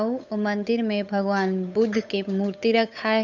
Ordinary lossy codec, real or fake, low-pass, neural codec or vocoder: none; fake; 7.2 kHz; codec, 16 kHz, 8 kbps, FunCodec, trained on Chinese and English, 25 frames a second